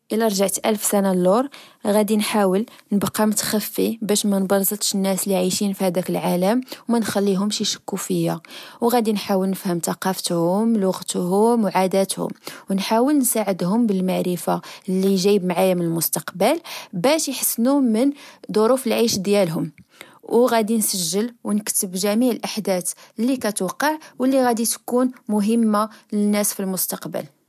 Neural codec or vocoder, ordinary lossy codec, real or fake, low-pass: none; MP3, 96 kbps; real; 14.4 kHz